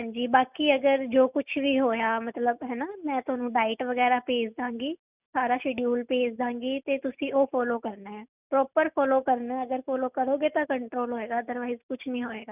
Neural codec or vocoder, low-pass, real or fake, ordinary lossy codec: none; 3.6 kHz; real; none